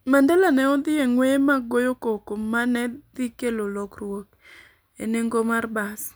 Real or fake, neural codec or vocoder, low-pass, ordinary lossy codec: real; none; none; none